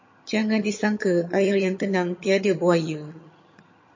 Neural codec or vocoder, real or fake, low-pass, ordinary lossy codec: vocoder, 22.05 kHz, 80 mel bands, HiFi-GAN; fake; 7.2 kHz; MP3, 32 kbps